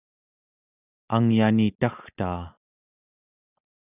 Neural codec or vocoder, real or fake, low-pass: none; real; 3.6 kHz